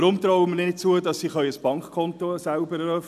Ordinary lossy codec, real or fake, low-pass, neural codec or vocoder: none; real; 14.4 kHz; none